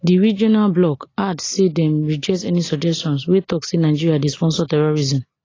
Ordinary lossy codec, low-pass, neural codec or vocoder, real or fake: AAC, 32 kbps; 7.2 kHz; none; real